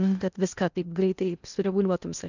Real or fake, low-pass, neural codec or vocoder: fake; 7.2 kHz; codec, 16 kHz in and 24 kHz out, 0.6 kbps, FocalCodec, streaming, 2048 codes